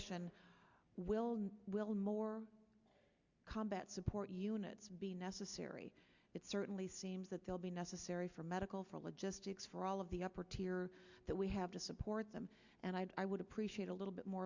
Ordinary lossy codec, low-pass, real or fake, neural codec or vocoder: Opus, 64 kbps; 7.2 kHz; real; none